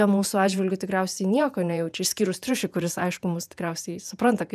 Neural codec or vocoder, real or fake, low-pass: vocoder, 48 kHz, 128 mel bands, Vocos; fake; 14.4 kHz